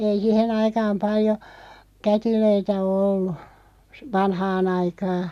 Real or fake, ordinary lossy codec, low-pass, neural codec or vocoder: real; none; 14.4 kHz; none